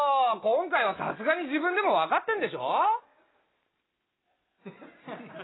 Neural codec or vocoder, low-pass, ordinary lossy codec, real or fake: none; 7.2 kHz; AAC, 16 kbps; real